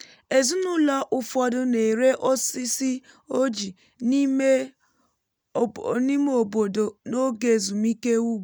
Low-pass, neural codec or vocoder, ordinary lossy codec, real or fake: none; none; none; real